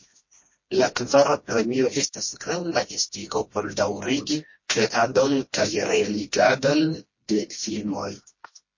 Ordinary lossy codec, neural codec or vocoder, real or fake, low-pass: MP3, 32 kbps; codec, 16 kHz, 1 kbps, FreqCodec, smaller model; fake; 7.2 kHz